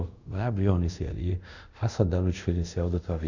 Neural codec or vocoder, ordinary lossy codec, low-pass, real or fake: codec, 24 kHz, 0.5 kbps, DualCodec; none; 7.2 kHz; fake